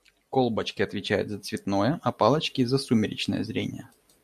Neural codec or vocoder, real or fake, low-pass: none; real; 14.4 kHz